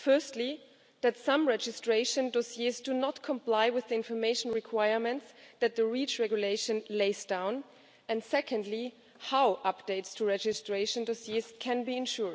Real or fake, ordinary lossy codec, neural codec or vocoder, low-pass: real; none; none; none